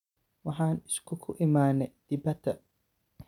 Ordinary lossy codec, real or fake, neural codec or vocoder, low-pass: none; real; none; 19.8 kHz